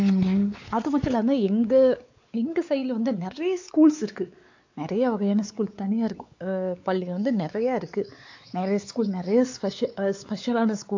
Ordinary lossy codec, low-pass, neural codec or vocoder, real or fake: AAC, 48 kbps; 7.2 kHz; codec, 16 kHz, 4 kbps, X-Codec, WavLM features, trained on Multilingual LibriSpeech; fake